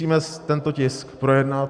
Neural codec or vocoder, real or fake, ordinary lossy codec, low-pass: none; real; Opus, 64 kbps; 9.9 kHz